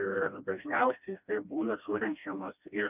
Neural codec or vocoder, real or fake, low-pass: codec, 16 kHz, 1 kbps, FreqCodec, smaller model; fake; 3.6 kHz